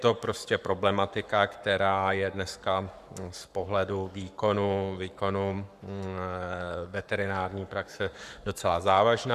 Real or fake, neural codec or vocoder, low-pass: fake; codec, 44.1 kHz, 7.8 kbps, Pupu-Codec; 14.4 kHz